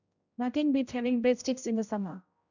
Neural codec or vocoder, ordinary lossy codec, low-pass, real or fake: codec, 16 kHz, 0.5 kbps, X-Codec, HuBERT features, trained on general audio; none; 7.2 kHz; fake